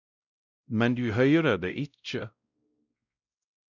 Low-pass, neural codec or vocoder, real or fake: 7.2 kHz; codec, 16 kHz, 0.5 kbps, X-Codec, WavLM features, trained on Multilingual LibriSpeech; fake